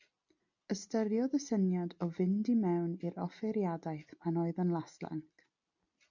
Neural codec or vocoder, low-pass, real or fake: none; 7.2 kHz; real